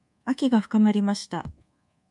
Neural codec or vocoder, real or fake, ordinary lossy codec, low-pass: codec, 24 kHz, 1.2 kbps, DualCodec; fake; MP3, 64 kbps; 10.8 kHz